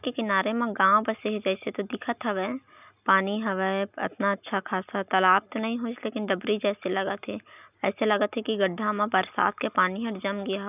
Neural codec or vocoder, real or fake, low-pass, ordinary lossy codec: none; real; 3.6 kHz; none